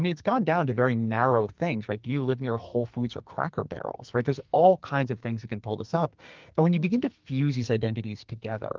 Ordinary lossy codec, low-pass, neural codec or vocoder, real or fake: Opus, 32 kbps; 7.2 kHz; codec, 44.1 kHz, 2.6 kbps, SNAC; fake